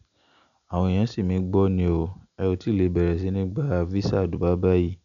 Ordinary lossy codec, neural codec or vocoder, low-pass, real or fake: none; none; 7.2 kHz; real